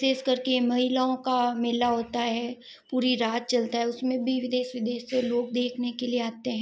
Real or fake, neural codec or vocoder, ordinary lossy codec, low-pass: real; none; none; none